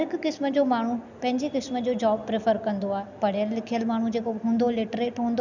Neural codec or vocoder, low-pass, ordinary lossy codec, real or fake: none; 7.2 kHz; none; real